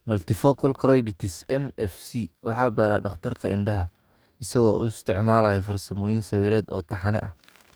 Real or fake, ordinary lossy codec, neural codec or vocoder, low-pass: fake; none; codec, 44.1 kHz, 2.6 kbps, DAC; none